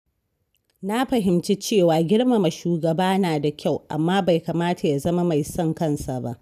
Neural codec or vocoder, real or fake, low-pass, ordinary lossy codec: none; real; 14.4 kHz; none